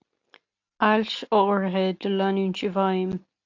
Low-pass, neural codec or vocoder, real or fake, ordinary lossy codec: 7.2 kHz; none; real; AAC, 48 kbps